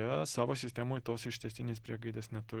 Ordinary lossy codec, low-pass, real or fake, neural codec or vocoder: Opus, 16 kbps; 19.8 kHz; real; none